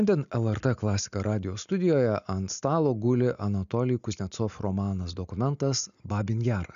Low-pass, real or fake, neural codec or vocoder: 7.2 kHz; real; none